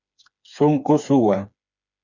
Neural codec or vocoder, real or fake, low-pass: codec, 16 kHz, 4 kbps, FreqCodec, smaller model; fake; 7.2 kHz